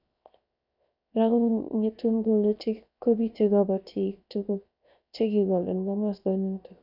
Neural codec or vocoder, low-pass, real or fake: codec, 16 kHz, 0.7 kbps, FocalCodec; 5.4 kHz; fake